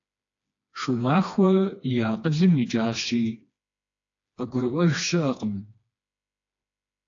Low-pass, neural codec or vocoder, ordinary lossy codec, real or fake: 7.2 kHz; codec, 16 kHz, 2 kbps, FreqCodec, smaller model; AAC, 48 kbps; fake